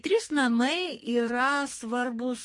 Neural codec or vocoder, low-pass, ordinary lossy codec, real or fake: codec, 44.1 kHz, 2.6 kbps, SNAC; 10.8 kHz; MP3, 48 kbps; fake